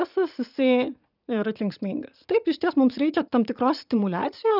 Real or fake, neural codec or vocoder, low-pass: fake; vocoder, 44.1 kHz, 80 mel bands, Vocos; 5.4 kHz